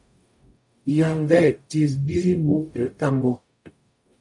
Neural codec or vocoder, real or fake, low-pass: codec, 44.1 kHz, 0.9 kbps, DAC; fake; 10.8 kHz